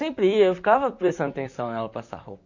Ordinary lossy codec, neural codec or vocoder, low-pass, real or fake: Opus, 64 kbps; vocoder, 44.1 kHz, 128 mel bands, Pupu-Vocoder; 7.2 kHz; fake